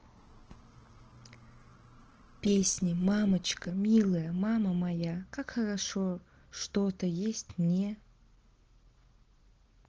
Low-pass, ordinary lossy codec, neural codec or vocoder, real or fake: 7.2 kHz; Opus, 16 kbps; none; real